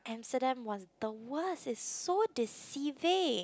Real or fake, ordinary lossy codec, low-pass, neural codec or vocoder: real; none; none; none